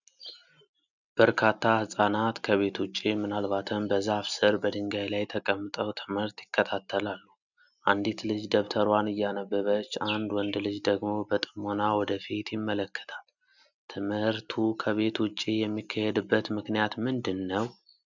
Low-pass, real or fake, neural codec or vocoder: 7.2 kHz; real; none